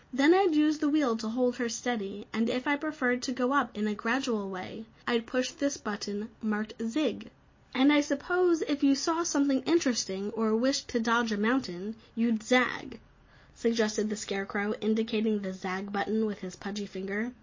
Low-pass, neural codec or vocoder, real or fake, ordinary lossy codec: 7.2 kHz; none; real; MP3, 32 kbps